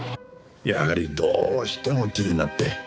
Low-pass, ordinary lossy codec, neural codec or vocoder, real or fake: none; none; codec, 16 kHz, 4 kbps, X-Codec, HuBERT features, trained on balanced general audio; fake